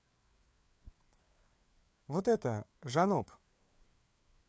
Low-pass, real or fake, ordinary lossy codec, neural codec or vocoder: none; fake; none; codec, 16 kHz, 4 kbps, FreqCodec, larger model